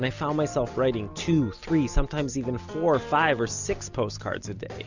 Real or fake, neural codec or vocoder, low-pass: real; none; 7.2 kHz